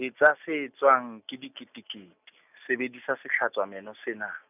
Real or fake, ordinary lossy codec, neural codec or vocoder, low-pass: real; none; none; 3.6 kHz